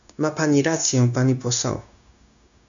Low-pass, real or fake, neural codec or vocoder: 7.2 kHz; fake; codec, 16 kHz, 0.9 kbps, LongCat-Audio-Codec